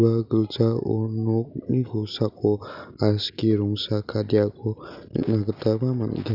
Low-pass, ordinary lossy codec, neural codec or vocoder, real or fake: 5.4 kHz; none; none; real